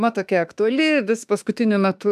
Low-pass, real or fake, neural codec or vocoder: 14.4 kHz; fake; autoencoder, 48 kHz, 32 numbers a frame, DAC-VAE, trained on Japanese speech